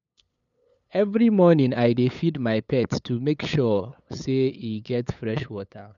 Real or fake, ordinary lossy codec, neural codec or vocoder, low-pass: fake; none; codec, 16 kHz, 8 kbps, FunCodec, trained on LibriTTS, 25 frames a second; 7.2 kHz